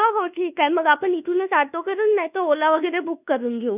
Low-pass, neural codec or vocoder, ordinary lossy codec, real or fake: 3.6 kHz; codec, 24 kHz, 1.2 kbps, DualCodec; none; fake